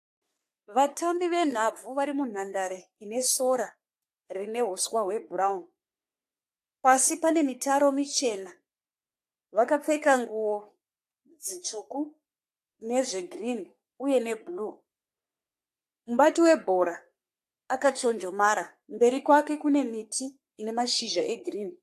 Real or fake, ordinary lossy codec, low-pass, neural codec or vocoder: fake; AAC, 64 kbps; 14.4 kHz; codec, 44.1 kHz, 3.4 kbps, Pupu-Codec